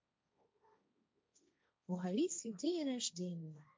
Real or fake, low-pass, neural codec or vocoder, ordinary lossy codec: fake; none; codec, 16 kHz, 1.1 kbps, Voila-Tokenizer; none